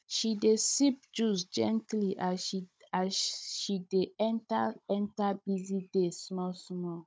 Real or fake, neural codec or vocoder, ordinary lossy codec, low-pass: fake; codec, 16 kHz, 16 kbps, FunCodec, trained on Chinese and English, 50 frames a second; none; none